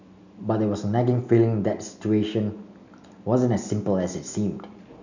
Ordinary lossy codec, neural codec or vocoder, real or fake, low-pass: none; none; real; 7.2 kHz